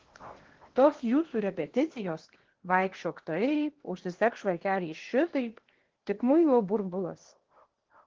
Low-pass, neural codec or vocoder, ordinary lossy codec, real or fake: 7.2 kHz; codec, 16 kHz in and 24 kHz out, 0.8 kbps, FocalCodec, streaming, 65536 codes; Opus, 16 kbps; fake